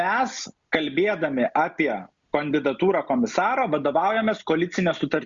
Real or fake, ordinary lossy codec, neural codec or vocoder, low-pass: real; Opus, 64 kbps; none; 7.2 kHz